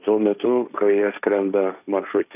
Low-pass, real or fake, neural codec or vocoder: 3.6 kHz; fake; codec, 16 kHz, 1.1 kbps, Voila-Tokenizer